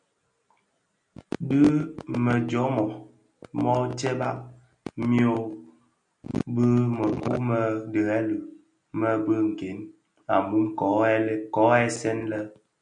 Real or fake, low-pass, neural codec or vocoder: real; 9.9 kHz; none